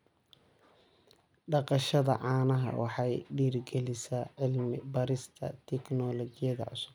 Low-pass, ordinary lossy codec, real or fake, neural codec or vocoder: 19.8 kHz; none; real; none